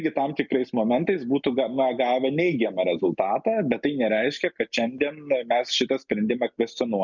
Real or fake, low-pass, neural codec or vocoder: real; 7.2 kHz; none